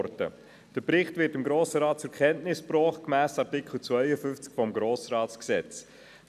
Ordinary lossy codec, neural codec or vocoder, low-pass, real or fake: none; none; 14.4 kHz; real